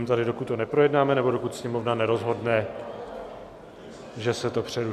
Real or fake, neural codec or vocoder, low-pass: real; none; 14.4 kHz